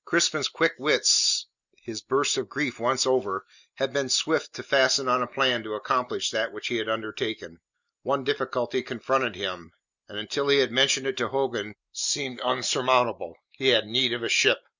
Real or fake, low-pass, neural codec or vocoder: real; 7.2 kHz; none